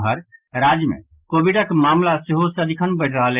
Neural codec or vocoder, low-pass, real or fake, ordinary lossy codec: none; 3.6 kHz; real; Opus, 24 kbps